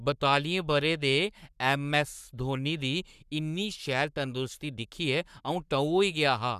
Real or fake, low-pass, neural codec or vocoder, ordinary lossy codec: fake; 14.4 kHz; codec, 44.1 kHz, 7.8 kbps, Pupu-Codec; none